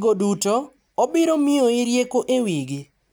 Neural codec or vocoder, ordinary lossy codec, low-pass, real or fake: none; none; none; real